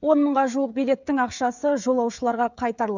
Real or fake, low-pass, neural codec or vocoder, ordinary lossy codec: fake; 7.2 kHz; codec, 16 kHz, 16 kbps, FreqCodec, smaller model; none